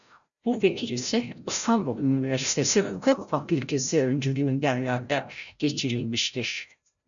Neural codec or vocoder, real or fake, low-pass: codec, 16 kHz, 0.5 kbps, FreqCodec, larger model; fake; 7.2 kHz